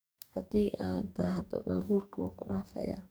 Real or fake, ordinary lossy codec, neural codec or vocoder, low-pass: fake; none; codec, 44.1 kHz, 2.6 kbps, DAC; none